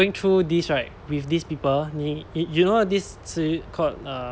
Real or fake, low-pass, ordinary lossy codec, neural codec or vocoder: real; none; none; none